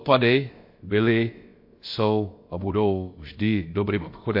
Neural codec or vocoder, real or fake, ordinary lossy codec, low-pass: codec, 16 kHz, about 1 kbps, DyCAST, with the encoder's durations; fake; MP3, 32 kbps; 5.4 kHz